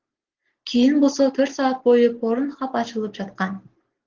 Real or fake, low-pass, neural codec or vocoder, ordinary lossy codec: real; 7.2 kHz; none; Opus, 16 kbps